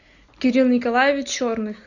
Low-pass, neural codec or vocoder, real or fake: 7.2 kHz; none; real